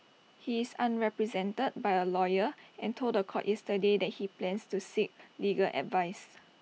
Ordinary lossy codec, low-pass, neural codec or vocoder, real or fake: none; none; none; real